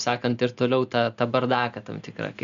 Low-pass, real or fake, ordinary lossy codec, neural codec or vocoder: 7.2 kHz; real; MP3, 64 kbps; none